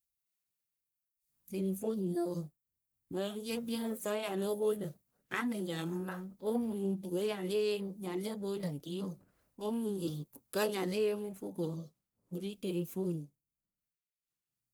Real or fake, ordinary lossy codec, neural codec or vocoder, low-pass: fake; none; codec, 44.1 kHz, 1.7 kbps, Pupu-Codec; none